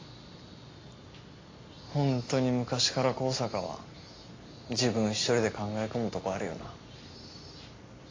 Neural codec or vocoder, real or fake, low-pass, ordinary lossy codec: none; real; 7.2 kHz; AAC, 32 kbps